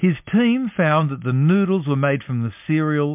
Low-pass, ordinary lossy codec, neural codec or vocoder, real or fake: 3.6 kHz; MP3, 32 kbps; none; real